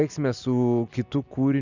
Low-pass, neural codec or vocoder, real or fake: 7.2 kHz; none; real